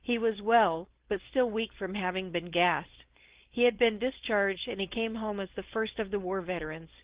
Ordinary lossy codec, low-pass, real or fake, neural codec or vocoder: Opus, 16 kbps; 3.6 kHz; fake; codec, 16 kHz, 4.8 kbps, FACodec